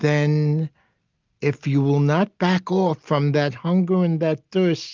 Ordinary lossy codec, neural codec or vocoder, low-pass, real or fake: Opus, 24 kbps; none; 7.2 kHz; real